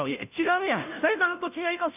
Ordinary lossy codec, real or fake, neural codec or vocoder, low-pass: none; fake; codec, 16 kHz, 0.5 kbps, FunCodec, trained on Chinese and English, 25 frames a second; 3.6 kHz